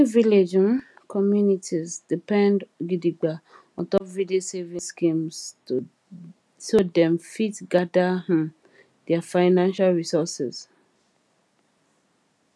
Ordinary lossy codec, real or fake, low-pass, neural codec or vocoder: none; real; none; none